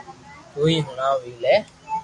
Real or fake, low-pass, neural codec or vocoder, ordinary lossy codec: real; 10.8 kHz; none; AAC, 64 kbps